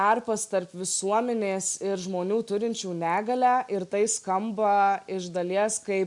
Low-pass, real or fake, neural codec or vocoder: 10.8 kHz; real; none